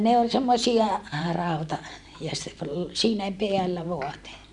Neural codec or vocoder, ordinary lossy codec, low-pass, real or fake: vocoder, 44.1 kHz, 128 mel bands every 512 samples, BigVGAN v2; none; 10.8 kHz; fake